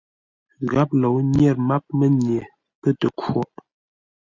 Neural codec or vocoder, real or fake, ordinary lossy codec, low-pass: none; real; Opus, 64 kbps; 7.2 kHz